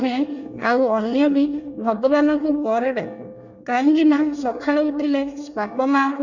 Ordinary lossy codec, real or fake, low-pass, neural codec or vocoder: none; fake; 7.2 kHz; codec, 24 kHz, 1 kbps, SNAC